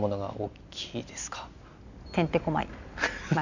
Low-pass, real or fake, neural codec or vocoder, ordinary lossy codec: 7.2 kHz; real; none; none